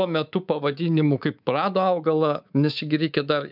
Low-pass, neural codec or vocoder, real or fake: 5.4 kHz; codec, 24 kHz, 3.1 kbps, DualCodec; fake